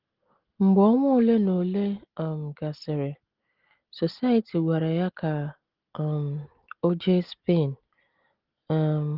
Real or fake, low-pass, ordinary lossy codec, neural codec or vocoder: real; 5.4 kHz; Opus, 16 kbps; none